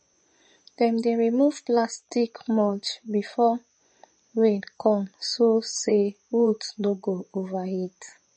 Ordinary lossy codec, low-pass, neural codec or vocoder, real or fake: MP3, 32 kbps; 10.8 kHz; vocoder, 24 kHz, 100 mel bands, Vocos; fake